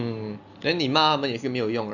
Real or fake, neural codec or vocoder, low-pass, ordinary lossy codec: real; none; 7.2 kHz; none